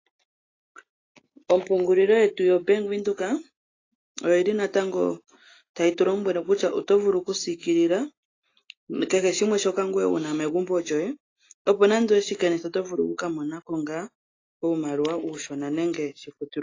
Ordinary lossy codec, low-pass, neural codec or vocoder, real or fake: AAC, 32 kbps; 7.2 kHz; none; real